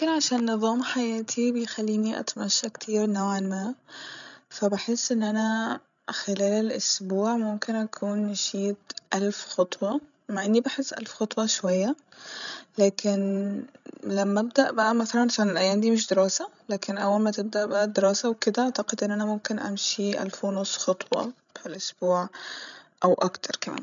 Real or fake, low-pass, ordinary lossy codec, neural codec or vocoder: fake; 7.2 kHz; none; codec, 16 kHz, 16 kbps, FreqCodec, larger model